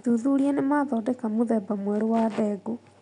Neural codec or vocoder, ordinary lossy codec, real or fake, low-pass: none; none; real; 10.8 kHz